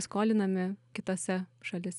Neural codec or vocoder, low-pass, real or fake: none; 10.8 kHz; real